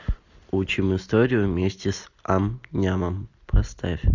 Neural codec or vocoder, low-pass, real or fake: none; 7.2 kHz; real